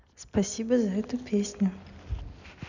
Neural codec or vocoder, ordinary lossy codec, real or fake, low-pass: none; none; real; 7.2 kHz